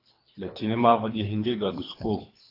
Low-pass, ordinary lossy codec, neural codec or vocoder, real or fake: 5.4 kHz; AAC, 32 kbps; codec, 24 kHz, 3 kbps, HILCodec; fake